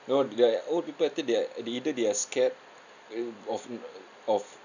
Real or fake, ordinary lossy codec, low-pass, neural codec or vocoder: real; none; 7.2 kHz; none